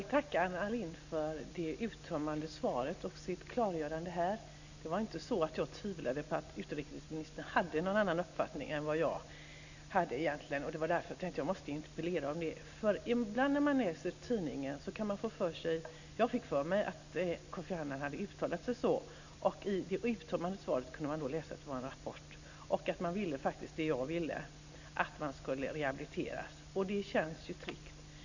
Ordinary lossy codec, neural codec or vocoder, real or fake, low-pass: none; none; real; 7.2 kHz